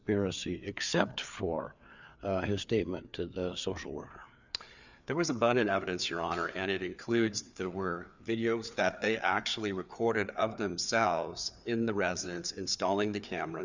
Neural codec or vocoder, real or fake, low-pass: codec, 16 kHz, 4 kbps, FreqCodec, larger model; fake; 7.2 kHz